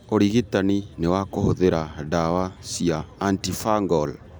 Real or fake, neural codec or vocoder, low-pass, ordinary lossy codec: real; none; none; none